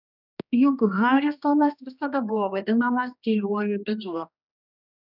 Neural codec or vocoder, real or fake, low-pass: codec, 16 kHz, 1 kbps, X-Codec, HuBERT features, trained on general audio; fake; 5.4 kHz